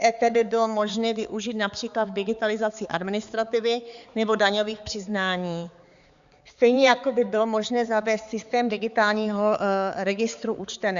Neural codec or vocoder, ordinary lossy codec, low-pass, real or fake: codec, 16 kHz, 4 kbps, X-Codec, HuBERT features, trained on balanced general audio; Opus, 64 kbps; 7.2 kHz; fake